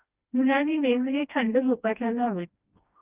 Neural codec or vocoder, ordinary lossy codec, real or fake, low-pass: codec, 16 kHz, 1 kbps, FreqCodec, smaller model; Opus, 24 kbps; fake; 3.6 kHz